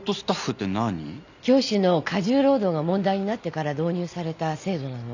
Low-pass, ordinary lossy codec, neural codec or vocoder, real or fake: 7.2 kHz; none; none; real